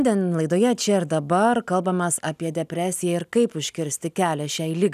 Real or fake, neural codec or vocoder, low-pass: real; none; 14.4 kHz